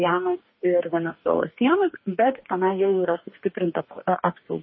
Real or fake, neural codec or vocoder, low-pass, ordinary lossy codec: fake; codec, 44.1 kHz, 2.6 kbps, DAC; 7.2 kHz; MP3, 24 kbps